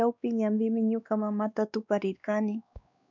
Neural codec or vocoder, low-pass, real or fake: codec, 16 kHz, 2 kbps, X-Codec, WavLM features, trained on Multilingual LibriSpeech; 7.2 kHz; fake